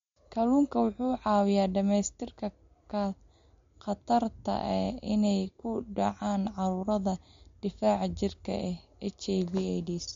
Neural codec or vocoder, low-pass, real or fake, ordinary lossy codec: none; 7.2 kHz; real; MP3, 48 kbps